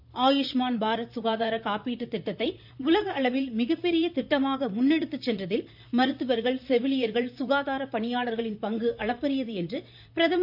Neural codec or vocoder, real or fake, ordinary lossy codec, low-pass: vocoder, 44.1 kHz, 128 mel bands, Pupu-Vocoder; fake; none; 5.4 kHz